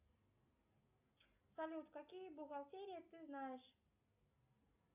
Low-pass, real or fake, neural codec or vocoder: 3.6 kHz; real; none